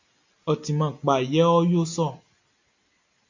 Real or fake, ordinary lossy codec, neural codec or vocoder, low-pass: real; AAC, 48 kbps; none; 7.2 kHz